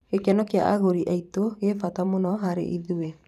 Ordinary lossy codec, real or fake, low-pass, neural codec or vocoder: none; fake; 14.4 kHz; vocoder, 44.1 kHz, 128 mel bands every 512 samples, BigVGAN v2